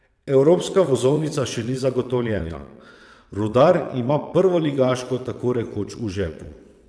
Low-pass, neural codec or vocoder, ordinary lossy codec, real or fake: none; vocoder, 22.05 kHz, 80 mel bands, WaveNeXt; none; fake